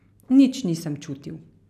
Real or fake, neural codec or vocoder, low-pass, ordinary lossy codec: real; none; 14.4 kHz; none